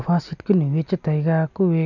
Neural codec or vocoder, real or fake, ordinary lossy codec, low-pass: none; real; none; 7.2 kHz